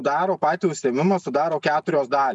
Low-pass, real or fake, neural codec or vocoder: 10.8 kHz; real; none